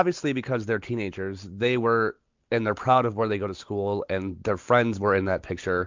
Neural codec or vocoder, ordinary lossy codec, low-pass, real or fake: none; MP3, 64 kbps; 7.2 kHz; real